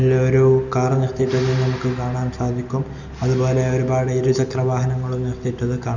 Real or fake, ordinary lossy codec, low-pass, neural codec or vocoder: real; none; 7.2 kHz; none